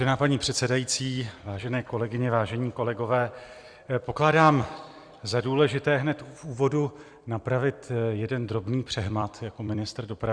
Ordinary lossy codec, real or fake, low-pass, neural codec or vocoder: Opus, 64 kbps; fake; 9.9 kHz; vocoder, 44.1 kHz, 128 mel bands every 256 samples, BigVGAN v2